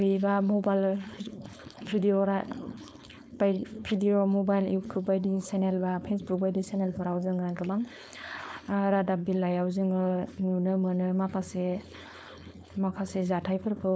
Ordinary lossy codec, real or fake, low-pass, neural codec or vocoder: none; fake; none; codec, 16 kHz, 4.8 kbps, FACodec